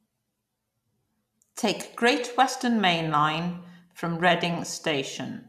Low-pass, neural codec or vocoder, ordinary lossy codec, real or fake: 14.4 kHz; none; none; real